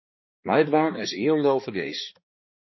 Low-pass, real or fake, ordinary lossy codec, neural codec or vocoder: 7.2 kHz; fake; MP3, 24 kbps; codec, 16 kHz, 2 kbps, X-Codec, HuBERT features, trained on balanced general audio